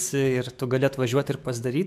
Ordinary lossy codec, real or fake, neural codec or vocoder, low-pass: MP3, 96 kbps; fake; autoencoder, 48 kHz, 128 numbers a frame, DAC-VAE, trained on Japanese speech; 14.4 kHz